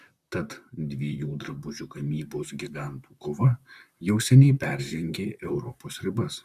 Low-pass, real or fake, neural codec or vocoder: 14.4 kHz; fake; vocoder, 44.1 kHz, 128 mel bands, Pupu-Vocoder